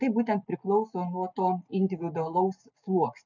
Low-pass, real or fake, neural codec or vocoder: 7.2 kHz; real; none